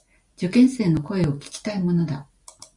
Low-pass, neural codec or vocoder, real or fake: 10.8 kHz; none; real